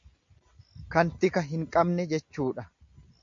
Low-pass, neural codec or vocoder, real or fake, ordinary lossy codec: 7.2 kHz; none; real; MP3, 48 kbps